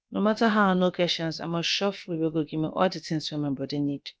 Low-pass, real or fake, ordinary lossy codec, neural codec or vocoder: none; fake; none; codec, 16 kHz, about 1 kbps, DyCAST, with the encoder's durations